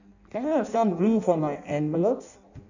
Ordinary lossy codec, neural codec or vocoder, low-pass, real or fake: none; codec, 16 kHz in and 24 kHz out, 0.6 kbps, FireRedTTS-2 codec; 7.2 kHz; fake